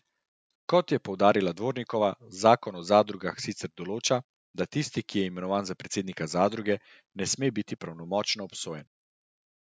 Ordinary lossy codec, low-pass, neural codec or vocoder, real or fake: none; none; none; real